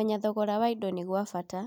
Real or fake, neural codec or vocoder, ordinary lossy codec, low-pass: real; none; none; 19.8 kHz